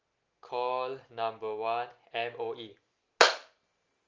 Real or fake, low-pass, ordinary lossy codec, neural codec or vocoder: real; 7.2 kHz; Opus, 32 kbps; none